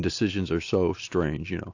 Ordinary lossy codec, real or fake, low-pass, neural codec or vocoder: AAC, 48 kbps; real; 7.2 kHz; none